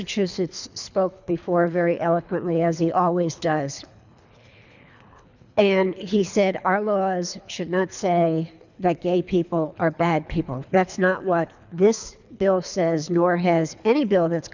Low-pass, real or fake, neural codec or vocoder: 7.2 kHz; fake; codec, 24 kHz, 3 kbps, HILCodec